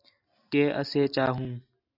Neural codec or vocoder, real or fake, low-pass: none; real; 5.4 kHz